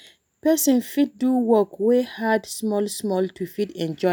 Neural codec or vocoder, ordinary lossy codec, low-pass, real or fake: none; none; none; real